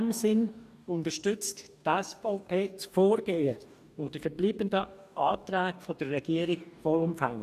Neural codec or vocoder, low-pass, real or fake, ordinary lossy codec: codec, 44.1 kHz, 2.6 kbps, DAC; 14.4 kHz; fake; none